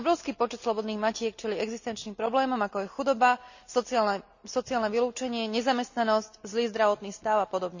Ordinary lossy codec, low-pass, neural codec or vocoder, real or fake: none; 7.2 kHz; none; real